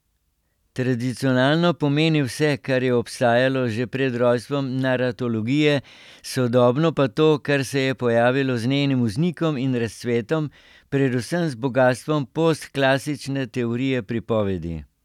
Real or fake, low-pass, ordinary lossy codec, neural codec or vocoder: real; 19.8 kHz; none; none